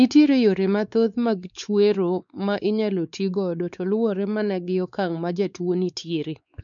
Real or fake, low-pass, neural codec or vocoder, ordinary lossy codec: fake; 7.2 kHz; codec, 16 kHz, 4 kbps, X-Codec, WavLM features, trained on Multilingual LibriSpeech; none